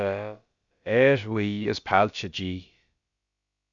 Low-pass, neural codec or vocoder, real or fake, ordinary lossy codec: 7.2 kHz; codec, 16 kHz, about 1 kbps, DyCAST, with the encoder's durations; fake; Opus, 64 kbps